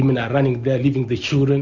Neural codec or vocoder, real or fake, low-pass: none; real; 7.2 kHz